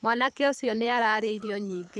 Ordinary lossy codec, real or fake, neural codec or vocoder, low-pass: none; fake; codec, 24 kHz, 3 kbps, HILCodec; none